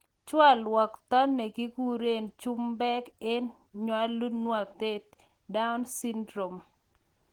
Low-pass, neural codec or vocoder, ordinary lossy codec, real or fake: 19.8 kHz; none; Opus, 16 kbps; real